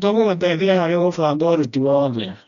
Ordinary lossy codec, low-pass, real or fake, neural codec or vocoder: none; 7.2 kHz; fake; codec, 16 kHz, 1 kbps, FreqCodec, smaller model